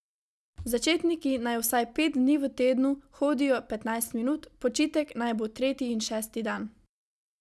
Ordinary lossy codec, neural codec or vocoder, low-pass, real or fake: none; none; none; real